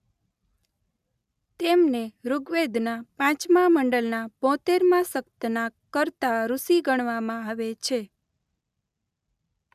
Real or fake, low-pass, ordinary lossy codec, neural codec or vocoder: real; 14.4 kHz; none; none